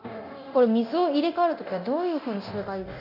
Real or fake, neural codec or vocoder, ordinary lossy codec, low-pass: fake; codec, 24 kHz, 0.9 kbps, DualCodec; none; 5.4 kHz